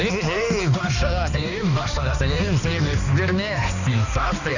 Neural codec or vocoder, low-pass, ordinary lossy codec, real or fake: codec, 16 kHz, 2 kbps, X-Codec, HuBERT features, trained on balanced general audio; 7.2 kHz; none; fake